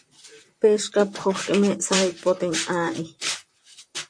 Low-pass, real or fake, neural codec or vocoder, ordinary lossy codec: 9.9 kHz; fake; vocoder, 44.1 kHz, 128 mel bands, Pupu-Vocoder; MP3, 48 kbps